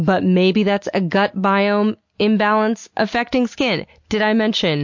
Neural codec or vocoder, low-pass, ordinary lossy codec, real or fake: none; 7.2 kHz; MP3, 48 kbps; real